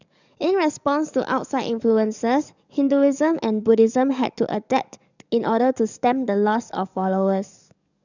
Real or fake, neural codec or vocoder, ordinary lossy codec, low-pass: fake; codec, 44.1 kHz, 7.8 kbps, DAC; none; 7.2 kHz